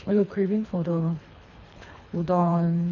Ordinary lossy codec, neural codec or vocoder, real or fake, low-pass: none; codec, 24 kHz, 3 kbps, HILCodec; fake; 7.2 kHz